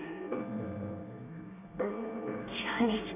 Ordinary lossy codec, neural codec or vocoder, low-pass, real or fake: none; codec, 24 kHz, 1 kbps, SNAC; 3.6 kHz; fake